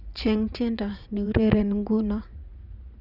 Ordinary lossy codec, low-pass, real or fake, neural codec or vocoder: none; 5.4 kHz; fake; vocoder, 44.1 kHz, 80 mel bands, Vocos